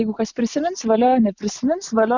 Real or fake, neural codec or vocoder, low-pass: real; none; 7.2 kHz